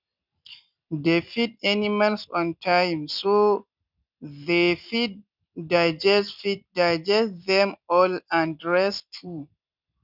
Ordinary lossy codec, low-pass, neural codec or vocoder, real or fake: none; 5.4 kHz; none; real